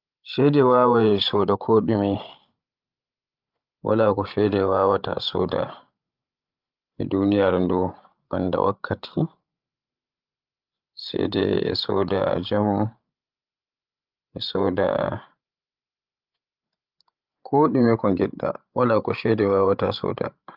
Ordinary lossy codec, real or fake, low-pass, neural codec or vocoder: Opus, 24 kbps; fake; 5.4 kHz; codec, 16 kHz, 16 kbps, FreqCodec, larger model